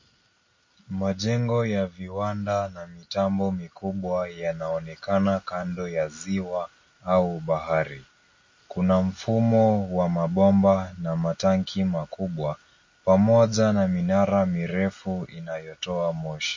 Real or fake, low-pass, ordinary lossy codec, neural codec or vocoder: real; 7.2 kHz; MP3, 32 kbps; none